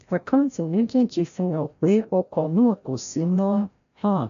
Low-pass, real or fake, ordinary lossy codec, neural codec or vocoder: 7.2 kHz; fake; AAC, 96 kbps; codec, 16 kHz, 0.5 kbps, FreqCodec, larger model